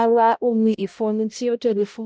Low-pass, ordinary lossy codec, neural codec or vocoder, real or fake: none; none; codec, 16 kHz, 0.5 kbps, X-Codec, HuBERT features, trained on balanced general audio; fake